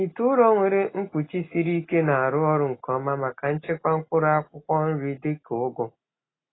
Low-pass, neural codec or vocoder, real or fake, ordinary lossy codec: 7.2 kHz; none; real; AAC, 16 kbps